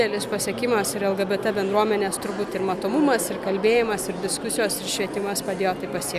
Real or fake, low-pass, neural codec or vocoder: real; 14.4 kHz; none